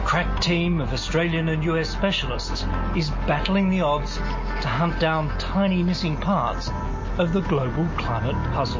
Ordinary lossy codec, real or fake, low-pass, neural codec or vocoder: MP3, 32 kbps; real; 7.2 kHz; none